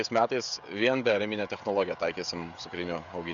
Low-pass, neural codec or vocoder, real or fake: 7.2 kHz; codec, 16 kHz, 16 kbps, FreqCodec, smaller model; fake